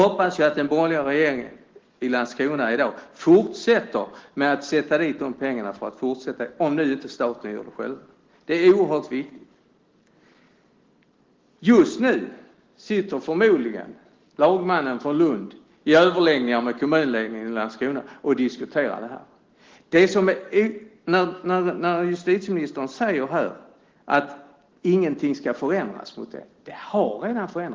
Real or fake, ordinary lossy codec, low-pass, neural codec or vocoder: real; Opus, 16 kbps; 7.2 kHz; none